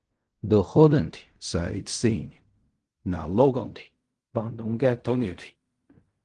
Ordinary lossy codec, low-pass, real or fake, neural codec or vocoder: Opus, 24 kbps; 10.8 kHz; fake; codec, 16 kHz in and 24 kHz out, 0.4 kbps, LongCat-Audio-Codec, fine tuned four codebook decoder